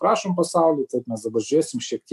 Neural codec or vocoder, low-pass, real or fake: none; 14.4 kHz; real